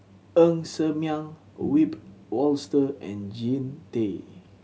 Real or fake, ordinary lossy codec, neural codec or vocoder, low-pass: real; none; none; none